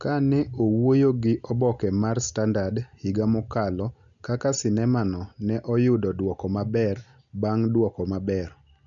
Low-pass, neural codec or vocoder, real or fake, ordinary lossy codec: 7.2 kHz; none; real; none